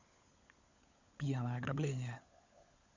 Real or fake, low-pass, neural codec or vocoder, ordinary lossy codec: fake; 7.2 kHz; codec, 16 kHz, 16 kbps, FunCodec, trained on LibriTTS, 50 frames a second; Opus, 64 kbps